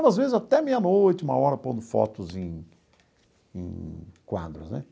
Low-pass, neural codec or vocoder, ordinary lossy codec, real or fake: none; none; none; real